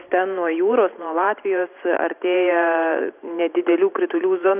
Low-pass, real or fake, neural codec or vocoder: 3.6 kHz; fake; vocoder, 44.1 kHz, 128 mel bands every 512 samples, BigVGAN v2